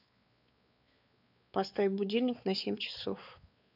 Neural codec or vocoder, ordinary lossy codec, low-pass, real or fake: codec, 16 kHz, 4 kbps, X-Codec, WavLM features, trained on Multilingual LibriSpeech; none; 5.4 kHz; fake